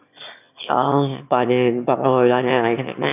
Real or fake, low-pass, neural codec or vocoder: fake; 3.6 kHz; autoencoder, 22.05 kHz, a latent of 192 numbers a frame, VITS, trained on one speaker